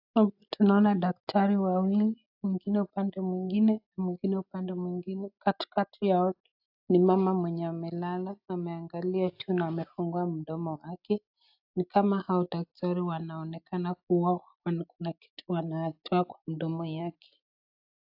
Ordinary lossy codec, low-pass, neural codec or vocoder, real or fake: AAC, 48 kbps; 5.4 kHz; none; real